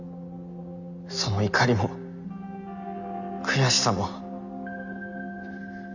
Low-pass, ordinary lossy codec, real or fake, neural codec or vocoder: 7.2 kHz; none; real; none